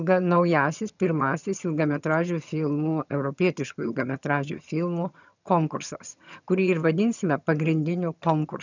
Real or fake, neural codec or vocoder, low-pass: fake; vocoder, 22.05 kHz, 80 mel bands, HiFi-GAN; 7.2 kHz